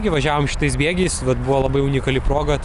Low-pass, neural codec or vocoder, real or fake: 10.8 kHz; none; real